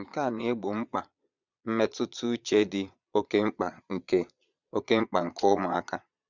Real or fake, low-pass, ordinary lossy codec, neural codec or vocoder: fake; 7.2 kHz; none; vocoder, 22.05 kHz, 80 mel bands, Vocos